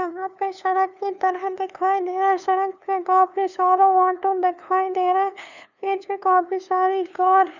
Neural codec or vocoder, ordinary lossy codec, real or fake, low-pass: codec, 16 kHz, 2 kbps, FunCodec, trained on LibriTTS, 25 frames a second; none; fake; 7.2 kHz